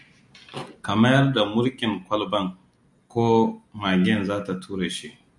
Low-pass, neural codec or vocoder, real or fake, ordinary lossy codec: 10.8 kHz; none; real; MP3, 96 kbps